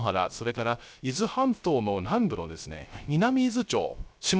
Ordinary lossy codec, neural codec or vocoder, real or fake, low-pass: none; codec, 16 kHz, 0.3 kbps, FocalCodec; fake; none